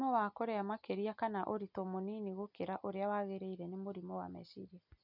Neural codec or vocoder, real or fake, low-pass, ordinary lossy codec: none; real; 5.4 kHz; none